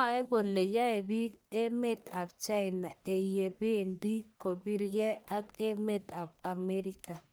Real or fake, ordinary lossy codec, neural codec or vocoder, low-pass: fake; none; codec, 44.1 kHz, 1.7 kbps, Pupu-Codec; none